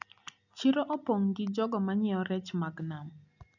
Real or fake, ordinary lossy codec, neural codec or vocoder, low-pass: real; none; none; 7.2 kHz